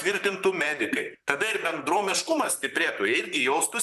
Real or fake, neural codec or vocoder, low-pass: fake; vocoder, 44.1 kHz, 128 mel bands, Pupu-Vocoder; 14.4 kHz